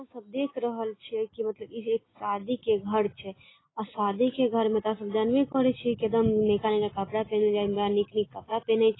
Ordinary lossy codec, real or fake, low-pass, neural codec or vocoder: AAC, 16 kbps; real; 7.2 kHz; none